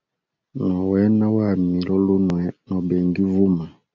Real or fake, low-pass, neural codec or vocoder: real; 7.2 kHz; none